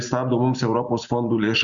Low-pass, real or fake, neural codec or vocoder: 7.2 kHz; real; none